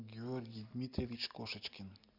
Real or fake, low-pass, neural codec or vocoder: real; 5.4 kHz; none